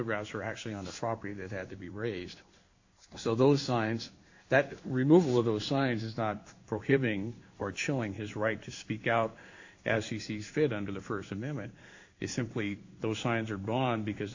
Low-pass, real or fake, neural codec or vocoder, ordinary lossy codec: 7.2 kHz; fake; codec, 16 kHz in and 24 kHz out, 1 kbps, XY-Tokenizer; AAC, 48 kbps